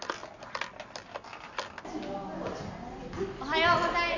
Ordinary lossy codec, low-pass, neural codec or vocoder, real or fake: none; 7.2 kHz; none; real